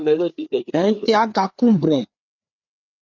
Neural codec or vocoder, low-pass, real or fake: codec, 16 kHz, 4 kbps, FreqCodec, larger model; 7.2 kHz; fake